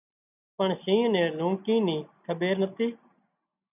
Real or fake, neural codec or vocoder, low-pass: real; none; 3.6 kHz